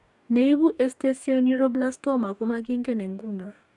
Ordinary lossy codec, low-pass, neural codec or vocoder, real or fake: none; 10.8 kHz; codec, 44.1 kHz, 2.6 kbps, DAC; fake